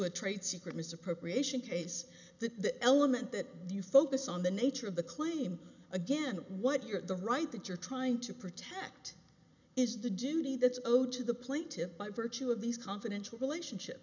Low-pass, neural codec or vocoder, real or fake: 7.2 kHz; none; real